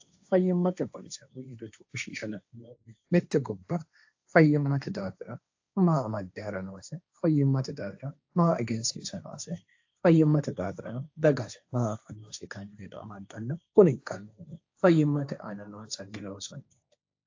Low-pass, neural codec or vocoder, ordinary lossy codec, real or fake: 7.2 kHz; codec, 16 kHz, 1.1 kbps, Voila-Tokenizer; AAC, 48 kbps; fake